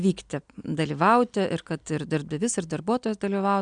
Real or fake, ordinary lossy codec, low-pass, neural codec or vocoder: real; MP3, 96 kbps; 9.9 kHz; none